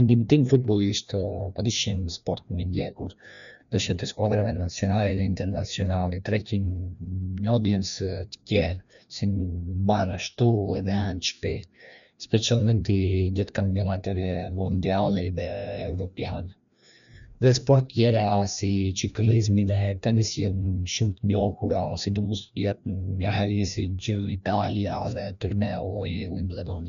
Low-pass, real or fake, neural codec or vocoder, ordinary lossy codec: 7.2 kHz; fake; codec, 16 kHz, 1 kbps, FreqCodec, larger model; none